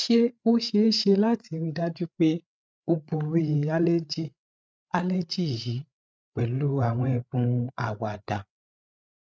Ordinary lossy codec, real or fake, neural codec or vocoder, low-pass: none; fake; codec, 16 kHz, 8 kbps, FreqCodec, larger model; none